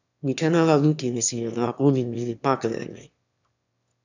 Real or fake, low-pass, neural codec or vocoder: fake; 7.2 kHz; autoencoder, 22.05 kHz, a latent of 192 numbers a frame, VITS, trained on one speaker